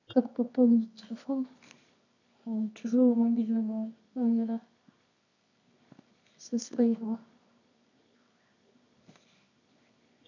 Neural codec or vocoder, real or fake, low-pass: codec, 24 kHz, 0.9 kbps, WavTokenizer, medium music audio release; fake; 7.2 kHz